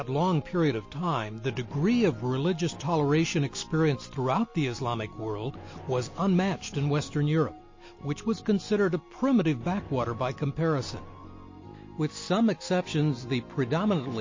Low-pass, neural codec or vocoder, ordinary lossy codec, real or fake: 7.2 kHz; none; MP3, 32 kbps; real